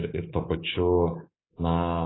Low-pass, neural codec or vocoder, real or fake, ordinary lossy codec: 7.2 kHz; codec, 24 kHz, 3.1 kbps, DualCodec; fake; AAC, 16 kbps